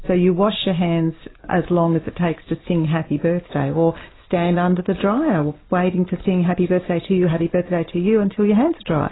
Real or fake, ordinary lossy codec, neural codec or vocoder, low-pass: real; AAC, 16 kbps; none; 7.2 kHz